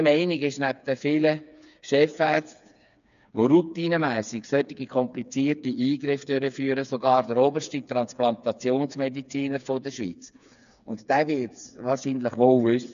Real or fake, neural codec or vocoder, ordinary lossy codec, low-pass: fake; codec, 16 kHz, 4 kbps, FreqCodec, smaller model; none; 7.2 kHz